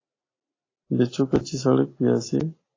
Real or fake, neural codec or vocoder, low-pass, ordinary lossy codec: real; none; 7.2 kHz; AAC, 32 kbps